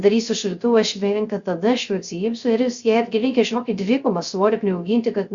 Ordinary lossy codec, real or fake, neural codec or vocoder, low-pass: Opus, 64 kbps; fake; codec, 16 kHz, 0.3 kbps, FocalCodec; 7.2 kHz